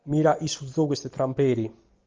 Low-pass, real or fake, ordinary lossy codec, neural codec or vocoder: 7.2 kHz; real; Opus, 24 kbps; none